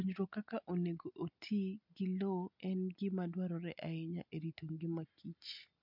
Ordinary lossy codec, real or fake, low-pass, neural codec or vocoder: MP3, 48 kbps; real; 5.4 kHz; none